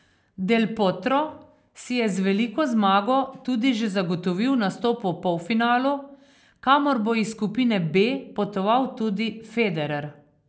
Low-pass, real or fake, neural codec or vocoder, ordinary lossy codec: none; real; none; none